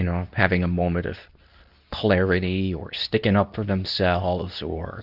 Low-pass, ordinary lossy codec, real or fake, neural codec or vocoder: 5.4 kHz; Opus, 64 kbps; fake; codec, 24 kHz, 0.9 kbps, WavTokenizer, medium speech release version 2